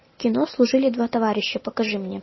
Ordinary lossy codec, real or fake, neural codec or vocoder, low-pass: MP3, 24 kbps; real; none; 7.2 kHz